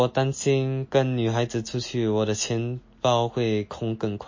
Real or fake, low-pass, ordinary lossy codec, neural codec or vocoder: real; 7.2 kHz; MP3, 32 kbps; none